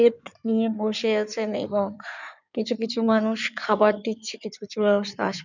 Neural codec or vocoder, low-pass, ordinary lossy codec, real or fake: codec, 16 kHz in and 24 kHz out, 2.2 kbps, FireRedTTS-2 codec; 7.2 kHz; none; fake